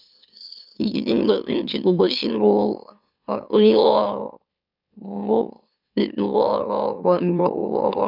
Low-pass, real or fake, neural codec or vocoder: 5.4 kHz; fake; autoencoder, 44.1 kHz, a latent of 192 numbers a frame, MeloTTS